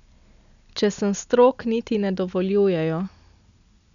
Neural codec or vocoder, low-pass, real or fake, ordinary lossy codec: none; 7.2 kHz; real; none